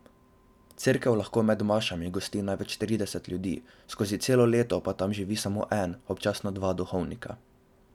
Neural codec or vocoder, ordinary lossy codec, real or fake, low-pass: none; none; real; 19.8 kHz